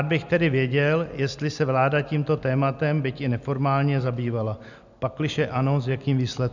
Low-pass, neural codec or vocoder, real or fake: 7.2 kHz; none; real